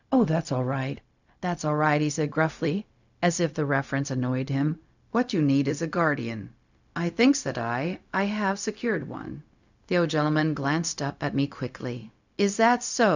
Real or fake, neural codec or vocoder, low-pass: fake; codec, 16 kHz, 0.4 kbps, LongCat-Audio-Codec; 7.2 kHz